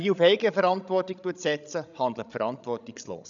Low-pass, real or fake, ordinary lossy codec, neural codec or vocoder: 7.2 kHz; fake; none; codec, 16 kHz, 16 kbps, FreqCodec, larger model